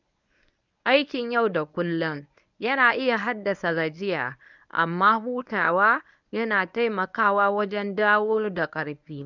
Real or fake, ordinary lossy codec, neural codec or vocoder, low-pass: fake; none; codec, 24 kHz, 0.9 kbps, WavTokenizer, medium speech release version 1; 7.2 kHz